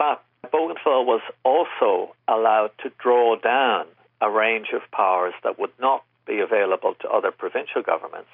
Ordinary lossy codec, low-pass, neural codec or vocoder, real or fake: MP3, 48 kbps; 5.4 kHz; none; real